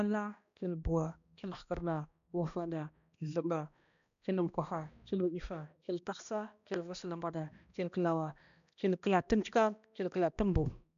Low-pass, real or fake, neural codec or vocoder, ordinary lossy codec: 7.2 kHz; fake; codec, 16 kHz, 1 kbps, X-Codec, HuBERT features, trained on balanced general audio; none